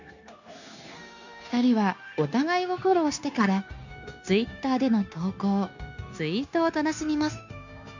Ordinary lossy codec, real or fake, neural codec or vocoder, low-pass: none; fake; codec, 16 kHz, 0.9 kbps, LongCat-Audio-Codec; 7.2 kHz